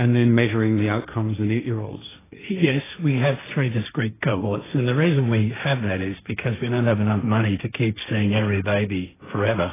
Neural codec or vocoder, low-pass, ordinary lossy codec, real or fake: codec, 16 kHz, 1.1 kbps, Voila-Tokenizer; 3.6 kHz; AAC, 16 kbps; fake